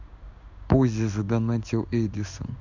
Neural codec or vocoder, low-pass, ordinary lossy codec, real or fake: codec, 16 kHz in and 24 kHz out, 1 kbps, XY-Tokenizer; 7.2 kHz; none; fake